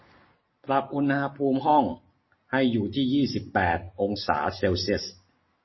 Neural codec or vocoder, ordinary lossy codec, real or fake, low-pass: none; MP3, 24 kbps; real; 7.2 kHz